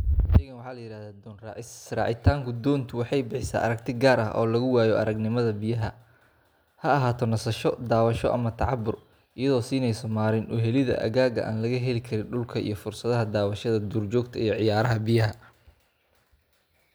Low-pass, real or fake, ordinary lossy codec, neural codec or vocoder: none; real; none; none